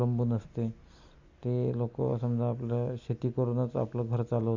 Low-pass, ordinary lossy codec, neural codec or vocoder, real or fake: 7.2 kHz; Opus, 64 kbps; none; real